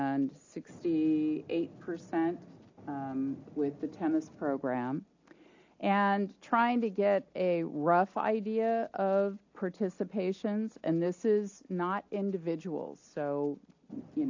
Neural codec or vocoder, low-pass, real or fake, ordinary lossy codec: none; 7.2 kHz; real; AAC, 48 kbps